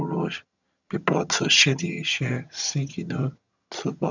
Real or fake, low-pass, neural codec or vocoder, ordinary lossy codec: fake; 7.2 kHz; vocoder, 22.05 kHz, 80 mel bands, HiFi-GAN; none